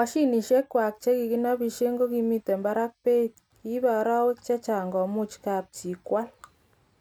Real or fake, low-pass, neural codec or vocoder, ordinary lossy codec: real; 19.8 kHz; none; none